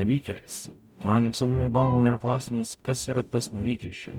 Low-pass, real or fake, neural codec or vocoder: 19.8 kHz; fake; codec, 44.1 kHz, 0.9 kbps, DAC